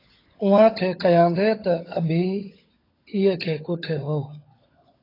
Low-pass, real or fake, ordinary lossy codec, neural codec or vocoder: 5.4 kHz; fake; AAC, 24 kbps; codec, 16 kHz, 16 kbps, FunCodec, trained on LibriTTS, 50 frames a second